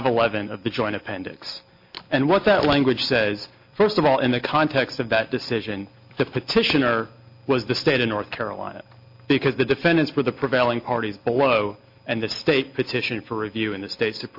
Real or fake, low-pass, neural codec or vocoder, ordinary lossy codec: real; 5.4 kHz; none; MP3, 32 kbps